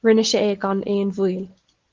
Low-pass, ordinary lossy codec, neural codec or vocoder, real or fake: 7.2 kHz; Opus, 16 kbps; none; real